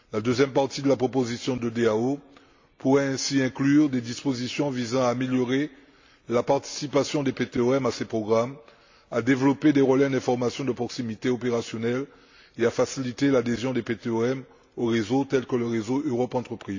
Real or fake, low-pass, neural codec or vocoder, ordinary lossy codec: real; 7.2 kHz; none; AAC, 48 kbps